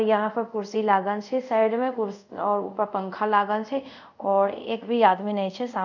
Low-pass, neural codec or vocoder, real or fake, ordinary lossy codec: 7.2 kHz; codec, 24 kHz, 0.5 kbps, DualCodec; fake; none